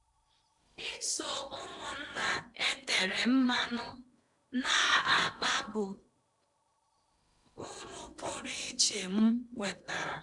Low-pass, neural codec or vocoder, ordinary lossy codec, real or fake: 10.8 kHz; codec, 16 kHz in and 24 kHz out, 0.8 kbps, FocalCodec, streaming, 65536 codes; none; fake